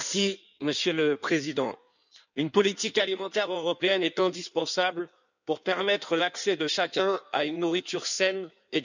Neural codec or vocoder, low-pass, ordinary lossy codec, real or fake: codec, 16 kHz in and 24 kHz out, 1.1 kbps, FireRedTTS-2 codec; 7.2 kHz; none; fake